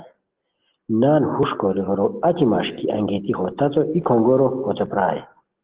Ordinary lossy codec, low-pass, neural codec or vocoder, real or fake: Opus, 16 kbps; 3.6 kHz; none; real